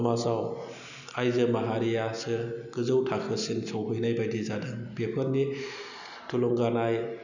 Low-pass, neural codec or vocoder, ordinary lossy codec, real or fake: 7.2 kHz; none; none; real